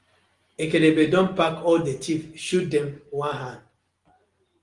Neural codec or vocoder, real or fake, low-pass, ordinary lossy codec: none; real; 10.8 kHz; Opus, 24 kbps